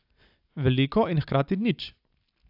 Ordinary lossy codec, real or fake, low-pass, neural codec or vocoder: AAC, 48 kbps; real; 5.4 kHz; none